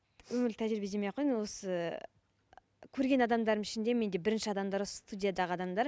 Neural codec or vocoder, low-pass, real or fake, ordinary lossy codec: none; none; real; none